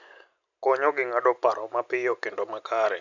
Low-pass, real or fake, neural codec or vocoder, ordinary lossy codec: 7.2 kHz; real; none; none